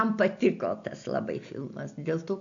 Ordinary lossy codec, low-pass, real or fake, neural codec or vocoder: AAC, 64 kbps; 7.2 kHz; real; none